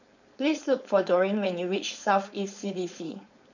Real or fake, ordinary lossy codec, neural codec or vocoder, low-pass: fake; none; codec, 16 kHz, 4.8 kbps, FACodec; 7.2 kHz